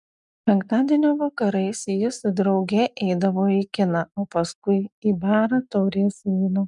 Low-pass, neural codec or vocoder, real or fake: 10.8 kHz; none; real